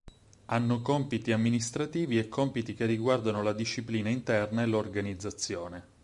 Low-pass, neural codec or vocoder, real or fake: 10.8 kHz; vocoder, 44.1 kHz, 128 mel bands every 512 samples, BigVGAN v2; fake